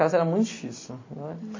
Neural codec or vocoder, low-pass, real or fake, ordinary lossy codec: none; 7.2 kHz; real; MP3, 32 kbps